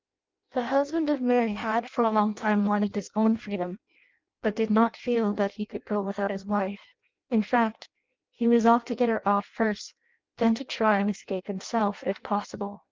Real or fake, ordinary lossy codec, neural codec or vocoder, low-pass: fake; Opus, 24 kbps; codec, 16 kHz in and 24 kHz out, 0.6 kbps, FireRedTTS-2 codec; 7.2 kHz